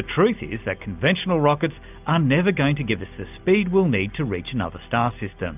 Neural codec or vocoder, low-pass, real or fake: none; 3.6 kHz; real